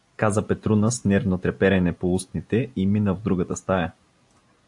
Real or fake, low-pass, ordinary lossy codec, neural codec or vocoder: real; 10.8 kHz; AAC, 64 kbps; none